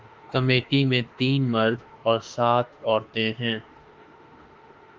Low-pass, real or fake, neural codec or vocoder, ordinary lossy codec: 7.2 kHz; fake; autoencoder, 48 kHz, 32 numbers a frame, DAC-VAE, trained on Japanese speech; Opus, 24 kbps